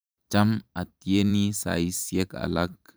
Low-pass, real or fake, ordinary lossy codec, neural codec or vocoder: none; fake; none; vocoder, 44.1 kHz, 128 mel bands every 512 samples, BigVGAN v2